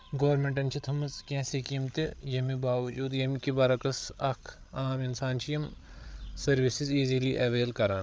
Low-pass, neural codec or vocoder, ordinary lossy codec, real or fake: none; codec, 16 kHz, 8 kbps, FreqCodec, larger model; none; fake